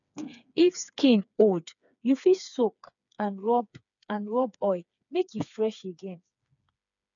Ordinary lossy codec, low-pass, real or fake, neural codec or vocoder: none; 7.2 kHz; fake; codec, 16 kHz, 4 kbps, FreqCodec, smaller model